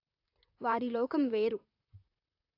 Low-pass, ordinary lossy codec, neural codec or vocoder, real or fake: 5.4 kHz; AAC, 32 kbps; vocoder, 44.1 kHz, 128 mel bands, Pupu-Vocoder; fake